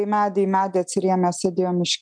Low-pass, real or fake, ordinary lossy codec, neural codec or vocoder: 9.9 kHz; real; MP3, 96 kbps; none